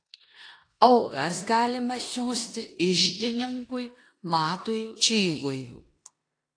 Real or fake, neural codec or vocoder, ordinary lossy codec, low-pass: fake; codec, 16 kHz in and 24 kHz out, 0.9 kbps, LongCat-Audio-Codec, four codebook decoder; AAC, 48 kbps; 9.9 kHz